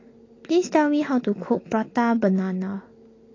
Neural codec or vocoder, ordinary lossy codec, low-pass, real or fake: none; AAC, 32 kbps; 7.2 kHz; real